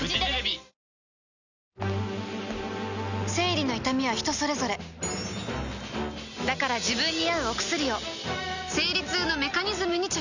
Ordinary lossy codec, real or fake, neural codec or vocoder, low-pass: none; real; none; 7.2 kHz